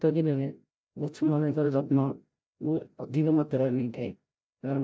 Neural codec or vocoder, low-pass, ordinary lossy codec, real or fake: codec, 16 kHz, 0.5 kbps, FreqCodec, larger model; none; none; fake